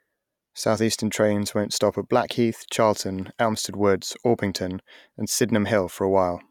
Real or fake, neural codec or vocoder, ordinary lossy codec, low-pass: real; none; none; 19.8 kHz